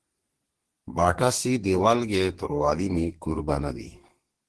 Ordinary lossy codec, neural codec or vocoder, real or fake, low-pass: Opus, 16 kbps; codec, 32 kHz, 1.9 kbps, SNAC; fake; 10.8 kHz